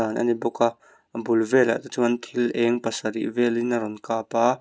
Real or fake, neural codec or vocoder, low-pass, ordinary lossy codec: real; none; none; none